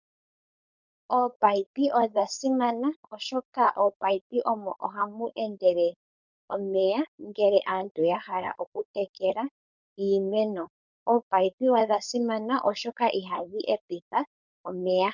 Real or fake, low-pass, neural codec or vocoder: fake; 7.2 kHz; codec, 16 kHz, 4.8 kbps, FACodec